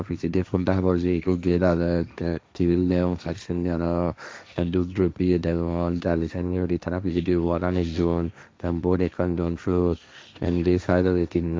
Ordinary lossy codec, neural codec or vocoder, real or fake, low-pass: none; codec, 16 kHz, 1.1 kbps, Voila-Tokenizer; fake; none